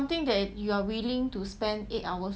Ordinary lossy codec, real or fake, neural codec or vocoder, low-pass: none; real; none; none